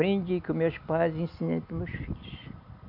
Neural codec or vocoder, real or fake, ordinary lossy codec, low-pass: none; real; none; 5.4 kHz